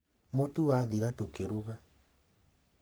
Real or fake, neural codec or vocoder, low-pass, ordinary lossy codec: fake; codec, 44.1 kHz, 3.4 kbps, Pupu-Codec; none; none